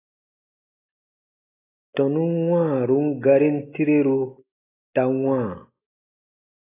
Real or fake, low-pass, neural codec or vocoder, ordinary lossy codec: real; 3.6 kHz; none; AAC, 16 kbps